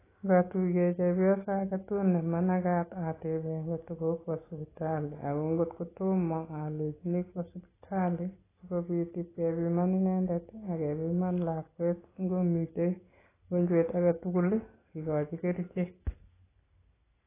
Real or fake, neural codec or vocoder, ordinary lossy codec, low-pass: real; none; AAC, 16 kbps; 3.6 kHz